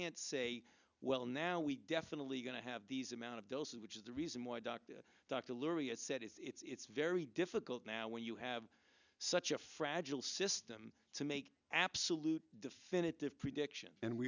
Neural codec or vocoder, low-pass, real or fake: none; 7.2 kHz; real